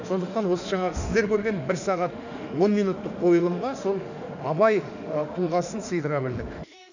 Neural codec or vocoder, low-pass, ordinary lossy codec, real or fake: autoencoder, 48 kHz, 32 numbers a frame, DAC-VAE, trained on Japanese speech; 7.2 kHz; none; fake